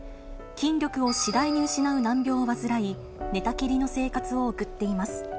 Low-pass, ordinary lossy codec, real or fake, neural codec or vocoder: none; none; real; none